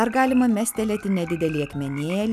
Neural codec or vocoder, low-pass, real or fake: none; 14.4 kHz; real